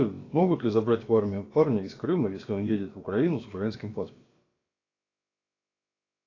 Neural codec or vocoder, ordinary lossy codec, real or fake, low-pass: codec, 16 kHz, about 1 kbps, DyCAST, with the encoder's durations; AAC, 32 kbps; fake; 7.2 kHz